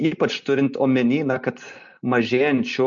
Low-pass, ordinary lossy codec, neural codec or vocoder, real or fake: 7.2 kHz; MP3, 48 kbps; none; real